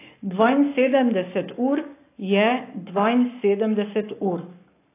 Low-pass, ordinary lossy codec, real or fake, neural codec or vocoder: 3.6 kHz; AAC, 24 kbps; fake; codec, 44.1 kHz, 7.8 kbps, Pupu-Codec